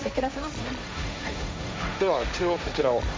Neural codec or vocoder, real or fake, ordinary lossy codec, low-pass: codec, 16 kHz, 1.1 kbps, Voila-Tokenizer; fake; none; none